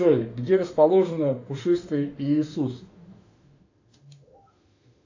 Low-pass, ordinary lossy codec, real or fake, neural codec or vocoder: 7.2 kHz; AAC, 48 kbps; fake; autoencoder, 48 kHz, 32 numbers a frame, DAC-VAE, trained on Japanese speech